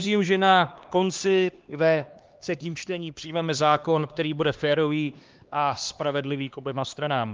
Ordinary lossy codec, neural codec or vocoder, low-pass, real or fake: Opus, 24 kbps; codec, 16 kHz, 2 kbps, X-Codec, HuBERT features, trained on LibriSpeech; 7.2 kHz; fake